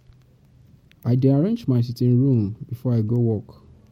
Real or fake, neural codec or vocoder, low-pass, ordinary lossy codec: real; none; 19.8 kHz; MP3, 64 kbps